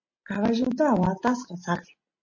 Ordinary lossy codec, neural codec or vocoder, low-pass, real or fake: MP3, 32 kbps; none; 7.2 kHz; real